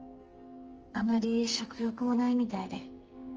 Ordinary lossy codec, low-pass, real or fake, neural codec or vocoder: Opus, 24 kbps; 7.2 kHz; fake; codec, 44.1 kHz, 2.6 kbps, SNAC